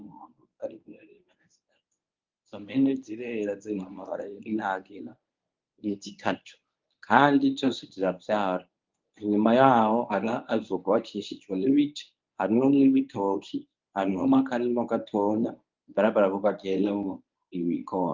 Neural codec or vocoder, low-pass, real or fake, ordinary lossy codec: codec, 24 kHz, 0.9 kbps, WavTokenizer, medium speech release version 1; 7.2 kHz; fake; Opus, 24 kbps